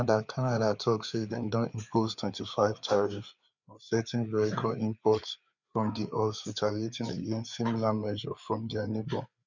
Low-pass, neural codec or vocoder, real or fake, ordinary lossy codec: 7.2 kHz; codec, 16 kHz, 4 kbps, FreqCodec, larger model; fake; none